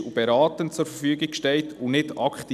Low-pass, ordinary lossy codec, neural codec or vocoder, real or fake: 14.4 kHz; none; none; real